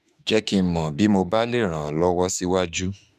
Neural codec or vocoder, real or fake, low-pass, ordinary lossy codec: autoencoder, 48 kHz, 32 numbers a frame, DAC-VAE, trained on Japanese speech; fake; 14.4 kHz; none